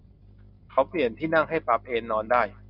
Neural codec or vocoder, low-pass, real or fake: none; 5.4 kHz; real